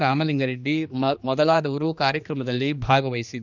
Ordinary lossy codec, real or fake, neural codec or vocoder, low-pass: none; fake; codec, 16 kHz, 2 kbps, X-Codec, HuBERT features, trained on general audio; 7.2 kHz